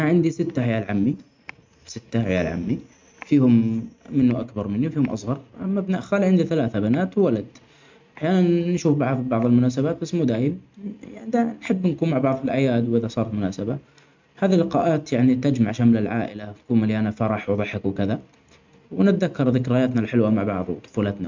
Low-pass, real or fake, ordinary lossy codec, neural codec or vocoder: 7.2 kHz; real; MP3, 64 kbps; none